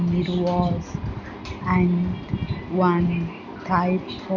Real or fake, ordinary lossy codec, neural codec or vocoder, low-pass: real; none; none; 7.2 kHz